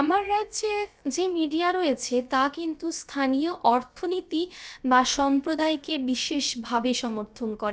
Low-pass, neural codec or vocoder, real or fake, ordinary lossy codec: none; codec, 16 kHz, about 1 kbps, DyCAST, with the encoder's durations; fake; none